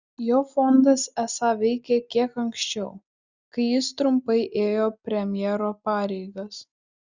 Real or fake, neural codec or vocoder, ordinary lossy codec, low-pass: real; none; Opus, 64 kbps; 7.2 kHz